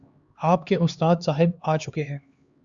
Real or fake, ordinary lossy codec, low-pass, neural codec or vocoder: fake; Opus, 64 kbps; 7.2 kHz; codec, 16 kHz, 2 kbps, X-Codec, HuBERT features, trained on LibriSpeech